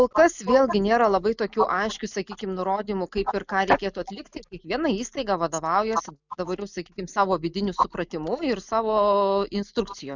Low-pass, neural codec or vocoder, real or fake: 7.2 kHz; none; real